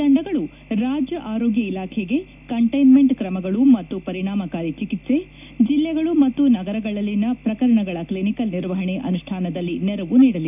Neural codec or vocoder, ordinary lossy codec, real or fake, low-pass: none; none; real; 3.6 kHz